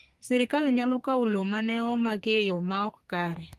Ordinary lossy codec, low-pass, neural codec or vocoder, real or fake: Opus, 24 kbps; 14.4 kHz; codec, 32 kHz, 1.9 kbps, SNAC; fake